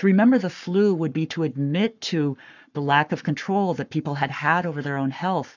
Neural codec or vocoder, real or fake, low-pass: codec, 44.1 kHz, 7.8 kbps, Pupu-Codec; fake; 7.2 kHz